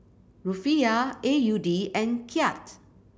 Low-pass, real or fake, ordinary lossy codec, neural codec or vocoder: none; real; none; none